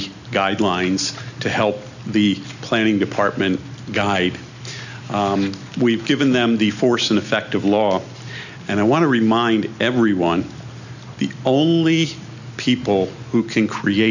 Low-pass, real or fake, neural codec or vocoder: 7.2 kHz; real; none